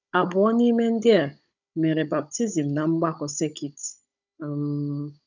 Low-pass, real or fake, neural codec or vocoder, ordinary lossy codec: 7.2 kHz; fake; codec, 16 kHz, 16 kbps, FunCodec, trained on Chinese and English, 50 frames a second; none